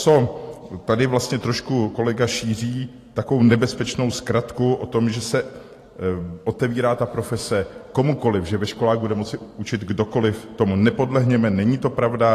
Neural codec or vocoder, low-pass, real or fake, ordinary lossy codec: vocoder, 44.1 kHz, 128 mel bands every 512 samples, BigVGAN v2; 14.4 kHz; fake; AAC, 48 kbps